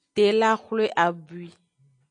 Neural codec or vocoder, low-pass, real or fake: none; 9.9 kHz; real